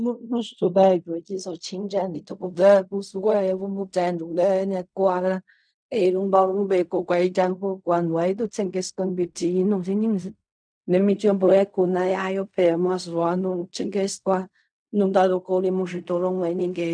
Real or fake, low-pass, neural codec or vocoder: fake; 9.9 kHz; codec, 16 kHz in and 24 kHz out, 0.4 kbps, LongCat-Audio-Codec, fine tuned four codebook decoder